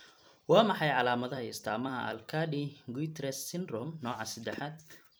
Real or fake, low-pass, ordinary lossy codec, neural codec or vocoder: fake; none; none; vocoder, 44.1 kHz, 128 mel bands every 256 samples, BigVGAN v2